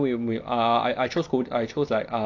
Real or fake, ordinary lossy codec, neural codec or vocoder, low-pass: real; none; none; 7.2 kHz